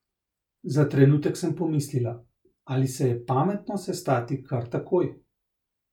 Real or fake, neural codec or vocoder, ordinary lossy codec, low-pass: real; none; none; 19.8 kHz